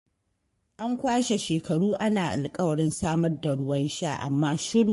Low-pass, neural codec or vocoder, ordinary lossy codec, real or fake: 14.4 kHz; codec, 44.1 kHz, 3.4 kbps, Pupu-Codec; MP3, 48 kbps; fake